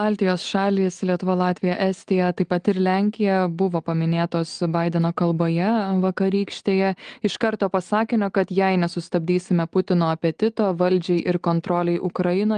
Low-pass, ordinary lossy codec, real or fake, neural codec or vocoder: 9.9 kHz; Opus, 24 kbps; real; none